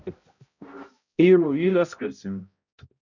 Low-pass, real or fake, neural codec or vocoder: 7.2 kHz; fake; codec, 16 kHz, 0.5 kbps, X-Codec, HuBERT features, trained on balanced general audio